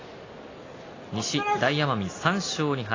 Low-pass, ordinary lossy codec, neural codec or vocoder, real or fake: 7.2 kHz; AAC, 32 kbps; none; real